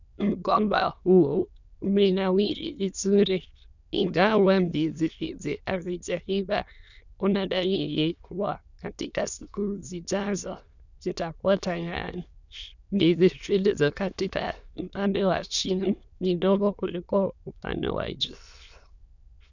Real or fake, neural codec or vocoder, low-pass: fake; autoencoder, 22.05 kHz, a latent of 192 numbers a frame, VITS, trained on many speakers; 7.2 kHz